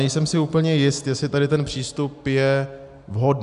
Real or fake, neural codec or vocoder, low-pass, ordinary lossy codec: real; none; 10.8 kHz; AAC, 96 kbps